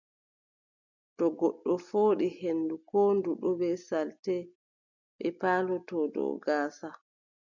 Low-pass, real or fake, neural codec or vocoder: 7.2 kHz; real; none